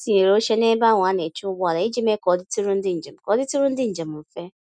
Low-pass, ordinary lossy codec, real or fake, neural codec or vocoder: none; none; real; none